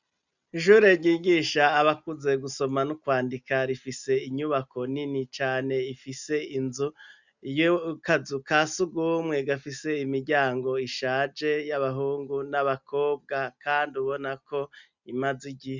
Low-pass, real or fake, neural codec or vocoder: 7.2 kHz; real; none